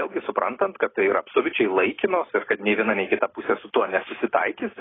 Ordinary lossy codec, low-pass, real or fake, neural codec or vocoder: AAC, 16 kbps; 7.2 kHz; real; none